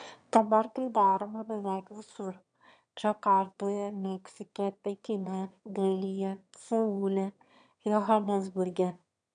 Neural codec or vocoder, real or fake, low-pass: autoencoder, 22.05 kHz, a latent of 192 numbers a frame, VITS, trained on one speaker; fake; 9.9 kHz